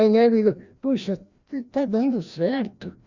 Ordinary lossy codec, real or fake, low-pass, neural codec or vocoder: Opus, 64 kbps; fake; 7.2 kHz; codec, 16 kHz, 1 kbps, FreqCodec, larger model